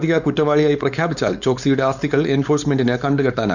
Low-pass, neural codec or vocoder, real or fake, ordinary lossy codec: 7.2 kHz; codec, 16 kHz, 4.8 kbps, FACodec; fake; none